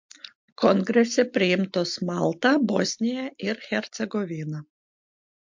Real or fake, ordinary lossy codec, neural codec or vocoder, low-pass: real; MP3, 48 kbps; none; 7.2 kHz